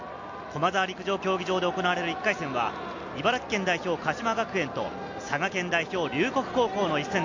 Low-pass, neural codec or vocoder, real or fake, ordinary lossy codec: 7.2 kHz; none; real; MP3, 64 kbps